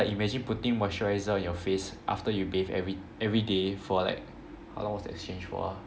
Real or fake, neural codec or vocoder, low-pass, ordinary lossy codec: real; none; none; none